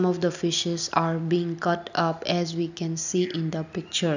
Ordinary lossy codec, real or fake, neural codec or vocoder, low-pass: none; real; none; 7.2 kHz